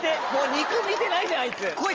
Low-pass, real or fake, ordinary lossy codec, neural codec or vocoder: 7.2 kHz; real; Opus, 24 kbps; none